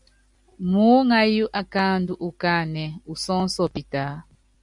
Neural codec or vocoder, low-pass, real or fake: none; 10.8 kHz; real